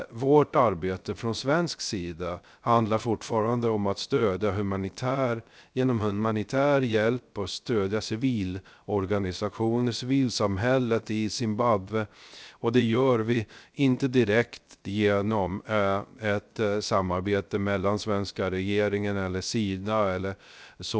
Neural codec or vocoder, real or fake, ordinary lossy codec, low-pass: codec, 16 kHz, 0.3 kbps, FocalCodec; fake; none; none